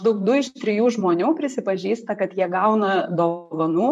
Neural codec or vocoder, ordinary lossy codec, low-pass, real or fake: vocoder, 44.1 kHz, 128 mel bands, Pupu-Vocoder; MP3, 64 kbps; 14.4 kHz; fake